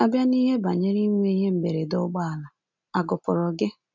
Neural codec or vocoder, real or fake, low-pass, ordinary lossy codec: none; real; 7.2 kHz; MP3, 64 kbps